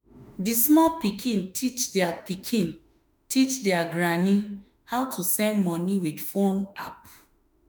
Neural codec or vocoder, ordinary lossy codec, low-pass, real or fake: autoencoder, 48 kHz, 32 numbers a frame, DAC-VAE, trained on Japanese speech; none; none; fake